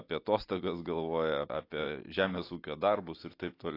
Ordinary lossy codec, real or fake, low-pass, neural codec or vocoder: AAC, 32 kbps; real; 5.4 kHz; none